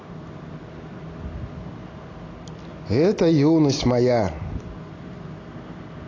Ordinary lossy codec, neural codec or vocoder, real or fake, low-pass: AAC, 32 kbps; none; real; 7.2 kHz